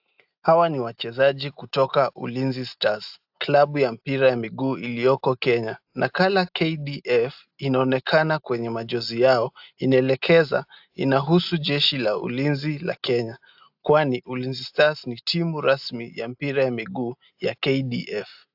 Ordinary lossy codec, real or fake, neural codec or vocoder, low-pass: AAC, 48 kbps; real; none; 5.4 kHz